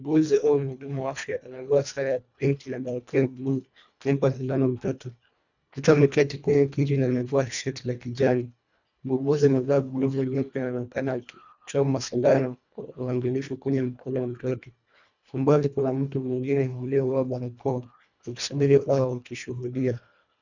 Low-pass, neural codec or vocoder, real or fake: 7.2 kHz; codec, 24 kHz, 1.5 kbps, HILCodec; fake